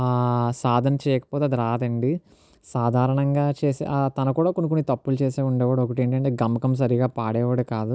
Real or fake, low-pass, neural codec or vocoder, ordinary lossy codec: real; none; none; none